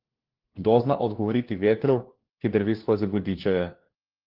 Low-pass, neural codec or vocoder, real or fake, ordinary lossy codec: 5.4 kHz; codec, 16 kHz, 1 kbps, FunCodec, trained on LibriTTS, 50 frames a second; fake; Opus, 16 kbps